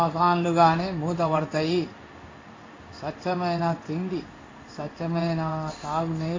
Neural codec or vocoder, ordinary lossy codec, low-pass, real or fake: codec, 16 kHz in and 24 kHz out, 1 kbps, XY-Tokenizer; AAC, 32 kbps; 7.2 kHz; fake